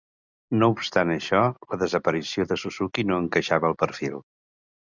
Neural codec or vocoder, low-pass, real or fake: none; 7.2 kHz; real